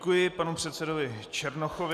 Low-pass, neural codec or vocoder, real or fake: 14.4 kHz; none; real